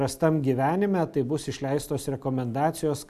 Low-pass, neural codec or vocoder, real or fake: 14.4 kHz; none; real